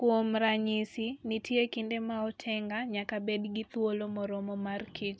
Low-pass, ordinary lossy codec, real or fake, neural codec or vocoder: none; none; real; none